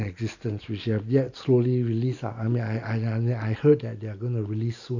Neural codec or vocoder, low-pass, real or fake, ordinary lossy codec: none; 7.2 kHz; real; none